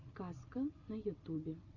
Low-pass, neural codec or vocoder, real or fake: 7.2 kHz; none; real